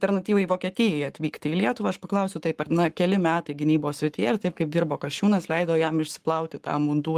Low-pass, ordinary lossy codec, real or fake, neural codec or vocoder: 14.4 kHz; Opus, 24 kbps; fake; codec, 44.1 kHz, 7.8 kbps, Pupu-Codec